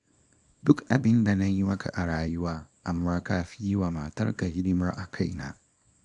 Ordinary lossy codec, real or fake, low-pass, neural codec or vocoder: none; fake; 10.8 kHz; codec, 24 kHz, 0.9 kbps, WavTokenizer, small release